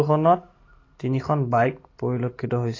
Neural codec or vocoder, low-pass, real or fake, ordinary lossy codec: none; 7.2 kHz; real; AAC, 32 kbps